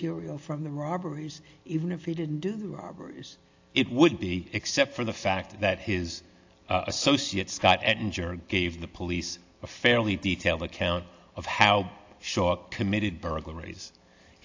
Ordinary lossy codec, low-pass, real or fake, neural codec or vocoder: AAC, 48 kbps; 7.2 kHz; real; none